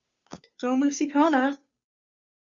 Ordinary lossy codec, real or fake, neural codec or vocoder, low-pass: Opus, 64 kbps; fake; codec, 16 kHz, 2 kbps, FunCodec, trained on Chinese and English, 25 frames a second; 7.2 kHz